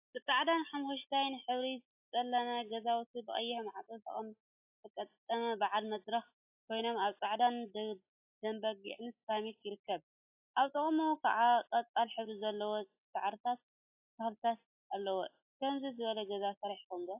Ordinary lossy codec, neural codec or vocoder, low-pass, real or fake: AAC, 32 kbps; none; 3.6 kHz; real